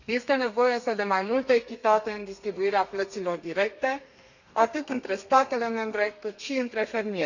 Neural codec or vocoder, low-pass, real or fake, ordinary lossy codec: codec, 32 kHz, 1.9 kbps, SNAC; 7.2 kHz; fake; none